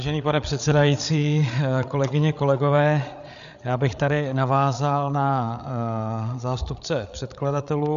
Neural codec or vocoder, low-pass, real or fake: codec, 16 kHz, 8 kbps, FreqCodec, larger model; 7.2 kHz; fake